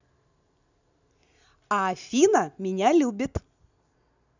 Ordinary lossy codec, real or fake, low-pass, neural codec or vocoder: none; real; 7.2 kHz; none